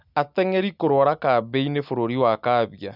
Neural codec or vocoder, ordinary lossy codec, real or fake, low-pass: none; none; real; 5.4 kHz